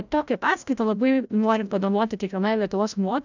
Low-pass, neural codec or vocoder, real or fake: 7.2 kHz; codec, 16 kHz, 0.5 kbps, FreqCodec, larger model; fake